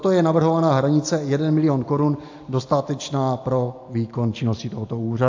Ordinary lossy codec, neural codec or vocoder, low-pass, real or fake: AAC, 48 kbps; none; 7.2 kHz; real